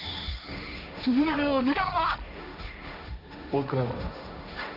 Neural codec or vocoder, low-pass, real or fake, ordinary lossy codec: codec, 16 kHz, 1.1 kbps, Voila-Tokenizer; 5.4 kHz; fake; none